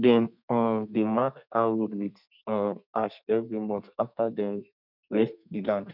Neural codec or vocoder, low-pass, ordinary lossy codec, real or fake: codec, 32 kHz, 1.9 kbps, SNAC; 5.4 kHz; none; fake